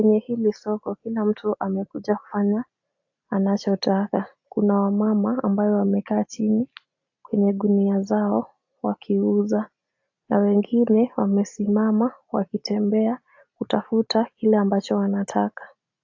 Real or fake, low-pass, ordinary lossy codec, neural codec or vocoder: real; 7.2 kHz; AAC, 48 kbps; none